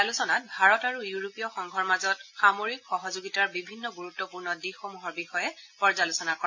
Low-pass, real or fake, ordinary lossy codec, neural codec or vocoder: 7.2 kHz; real; MP3, 48 kbps; none